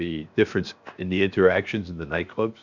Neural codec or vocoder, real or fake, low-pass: codec, 16 kHz, 0.7 kbps, FocalCodec; fake; 7.2 kHz